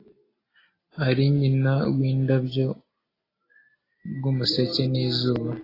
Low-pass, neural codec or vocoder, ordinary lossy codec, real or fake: 5.4 kHz; none; AAC, 24 kbps; real